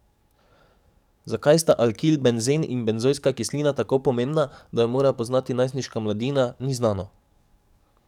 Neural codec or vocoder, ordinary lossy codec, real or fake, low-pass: codec, 44.1 kHz, 7.8 kbps, DAC; none; fake; 19.8 kHz